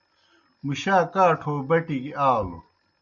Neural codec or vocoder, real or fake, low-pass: none; real; 7.2 kHz